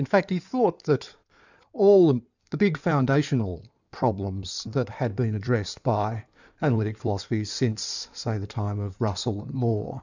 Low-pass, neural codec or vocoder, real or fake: 7.2 kHz; codec, 16 kHz in and 24 kHz out, 2.2 kbps, FireRedTTS-2 codec; fake